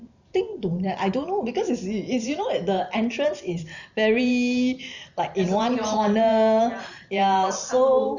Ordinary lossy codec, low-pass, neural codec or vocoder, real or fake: none; 7.2 kHz; vocoder, 44.1 kHz, 128 mel bands every 512 samples, BigVGAN v2; fake